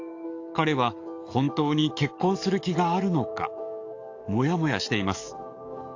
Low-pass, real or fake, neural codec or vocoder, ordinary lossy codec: 7.2 kHz; fake; codec, 44.1 kHz, 7.8 kbps, DAC; AAC, 48 kbps